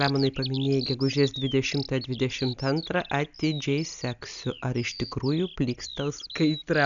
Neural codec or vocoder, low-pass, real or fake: none; 7.2 kHz; real